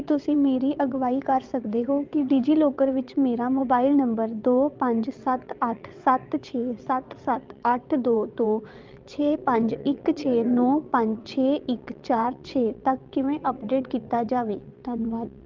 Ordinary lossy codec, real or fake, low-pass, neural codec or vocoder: Opus, 24 kbps; fake; 7.2 kHz; vocoder, 22.05 kHz, 80 mel bands, WaveNeXt